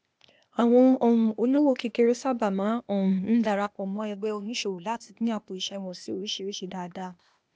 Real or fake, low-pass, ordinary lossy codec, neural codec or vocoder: fake; none; none; codec, 16 kHz, 0.8 kbps, ZipCodec